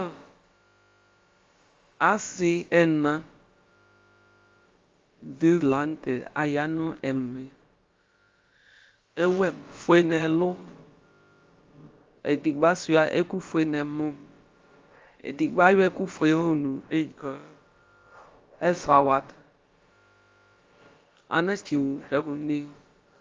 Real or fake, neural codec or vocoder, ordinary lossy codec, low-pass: fake; codec, 16 kHz, about 1 kbps, DyCAST, with the encoder's durations; Opus, 32 kbps; 7.2 kHz